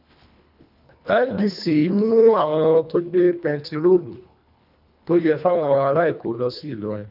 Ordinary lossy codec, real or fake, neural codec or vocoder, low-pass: none; fake; codec, 24 kHz, 1.5 kbps, HILCodec; 5.4 kHz